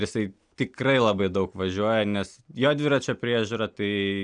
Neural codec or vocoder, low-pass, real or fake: none; 9.9 kHz; real